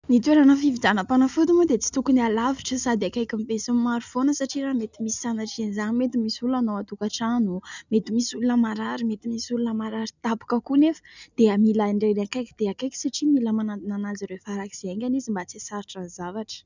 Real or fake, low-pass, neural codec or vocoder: real; 7.2 kHz; none